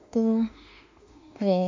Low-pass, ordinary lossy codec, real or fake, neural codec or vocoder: 7.2 kHz; MP3, 64 kbps; fake; autoencoder, 48 kHz, 32 numbers a frame, DAC-VAE, trained on Japanese speech